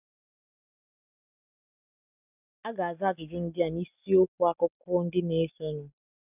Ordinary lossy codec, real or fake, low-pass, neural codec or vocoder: none; real; 3.6 kHz; none